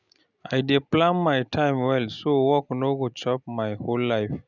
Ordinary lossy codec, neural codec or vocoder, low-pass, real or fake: none; none; 7.2 kHz; real